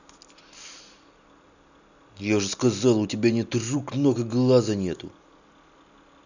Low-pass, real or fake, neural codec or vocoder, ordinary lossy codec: 7.2 kHz; real; none; none